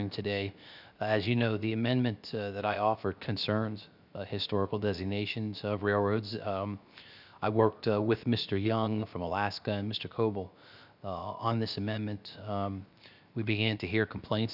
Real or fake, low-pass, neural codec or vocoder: fake; 5.4 kHz; codec, 16 kHz, 0.7 kbps, FocalCodec